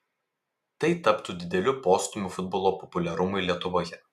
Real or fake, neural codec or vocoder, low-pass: real; none; 14.4 kHz